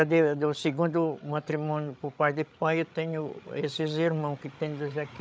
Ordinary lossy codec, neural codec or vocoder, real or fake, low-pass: none; codec, 16 kHz, 8 kbps, FreqCodec, larger model; fake; none